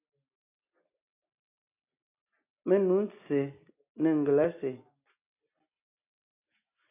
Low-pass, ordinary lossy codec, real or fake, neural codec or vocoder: 3.6 kHz; AAC, 32 kbps; real; none